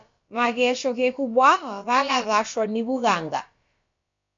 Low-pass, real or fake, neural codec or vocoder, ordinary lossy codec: 7.2 kHz; fake; codec, 16 kHz, about 1 kbps, DyCAST, with the encoder's durations; MP3, 48 kbps